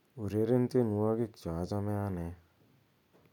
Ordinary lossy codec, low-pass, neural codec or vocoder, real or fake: none; 19.8 kHz; none; real